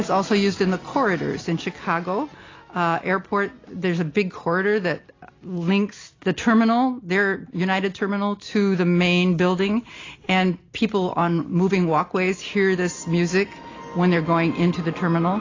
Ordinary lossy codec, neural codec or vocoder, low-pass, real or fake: AAC, 32 kbps; none; 7.2 kHz; real